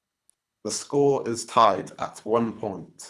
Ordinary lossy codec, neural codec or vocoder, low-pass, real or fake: none; codec, 24 kHz, 3 kbps, HILCodec; none; fake